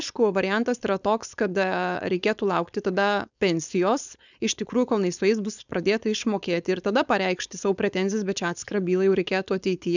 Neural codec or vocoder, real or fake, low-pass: codec, 16 kHz, 4.8 kbps, FACodec; fake; 7.2 kHz